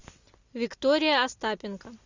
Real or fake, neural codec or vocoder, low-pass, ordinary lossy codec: real; none; 7.2 kHz; Opus, 64 kbps